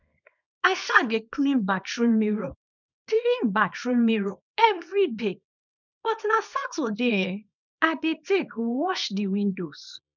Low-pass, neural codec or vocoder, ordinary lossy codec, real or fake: 7.2 kHz; codec, 24 kHz, 0.9 kbps, WavTokenizer, small release; none; fake